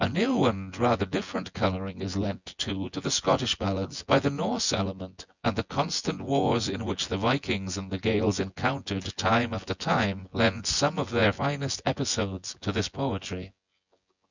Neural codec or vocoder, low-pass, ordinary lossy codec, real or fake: vocoder, 24 kHz, 100 mel bands, Vocos; 7.2 kHz; Opus, 64 kbps; fake